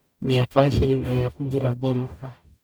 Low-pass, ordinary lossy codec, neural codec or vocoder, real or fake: none; none; codec, 44.1 kHz, 0.9 kbps, DAC; fake